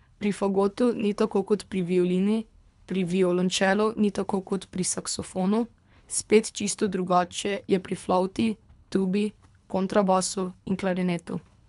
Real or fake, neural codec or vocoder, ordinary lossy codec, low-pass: fake; codec, 24 kHz, 3 kbps, HILCodec; none; 10.8 kHz